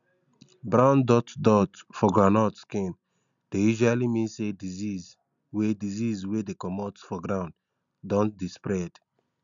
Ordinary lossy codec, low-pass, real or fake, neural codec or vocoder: none; 7.2 kHz; real; none